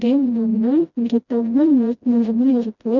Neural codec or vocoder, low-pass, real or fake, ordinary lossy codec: codec, 16 kHz, 0.5 kbps, FreqCodec, smaller model; 7.2 kHz; fake; none